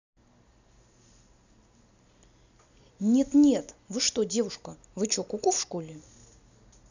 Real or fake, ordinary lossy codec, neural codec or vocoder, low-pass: real; none; none; 7.2 kHz